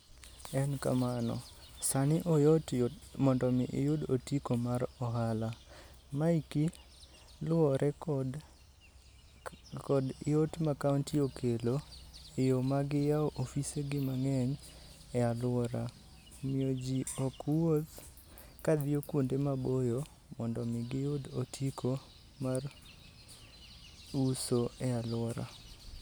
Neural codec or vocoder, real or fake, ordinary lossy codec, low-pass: none; real; none; none